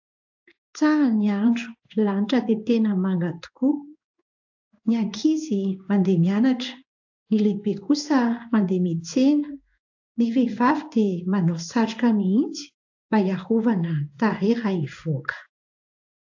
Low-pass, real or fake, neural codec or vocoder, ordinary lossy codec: 7.2 kHz; fake; codec, 16 kHz in and 24 kHz out, 1 kbps, XY-Tokenizer; AAC, 48 kbps